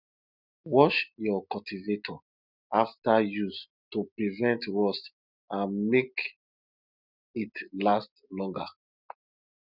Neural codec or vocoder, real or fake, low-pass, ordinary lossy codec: none; real; 5.4 kHz; none